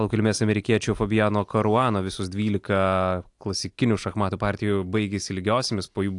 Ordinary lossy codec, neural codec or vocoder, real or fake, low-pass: AAC, 64 kbps; none; real; 10.8 kHz